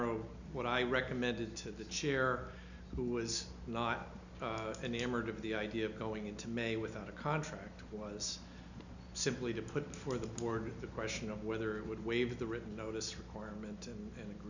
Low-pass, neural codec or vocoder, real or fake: 7.2 kHz; none; real